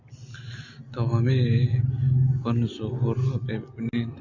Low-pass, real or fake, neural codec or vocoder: 7.2 kHz; real; none